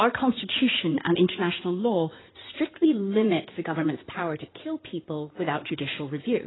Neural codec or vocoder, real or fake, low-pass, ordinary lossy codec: codec, 16 kHz in and 24 kHz out, 2.2 kbps, FireRedTTS-2 codec; fake; 7.2 kHz; AAC, 16 kbps